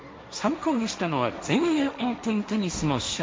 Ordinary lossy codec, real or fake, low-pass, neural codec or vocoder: none; fake; none; codec, 16 kHz, 1.1 kbps, Voila-Tokenizer